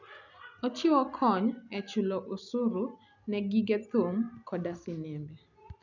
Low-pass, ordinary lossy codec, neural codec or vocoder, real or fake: 7.2 kHz; none; none; real